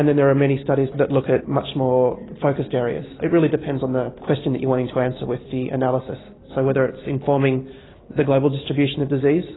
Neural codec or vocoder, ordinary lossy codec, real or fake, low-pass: none; AAC, 16 kbps; real; 7.2 kHz